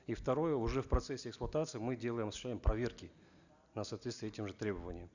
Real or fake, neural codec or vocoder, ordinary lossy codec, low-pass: real; none; none; 7.2 kHz